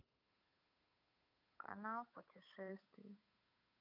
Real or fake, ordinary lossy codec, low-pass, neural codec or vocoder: fake; none; 5.4 kHz; codec, 16 kHz, 8 kbps, FunCodec, trained on Chinese and English, 25 frames a second